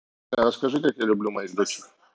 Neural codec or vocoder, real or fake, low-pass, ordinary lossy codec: none; real; none; none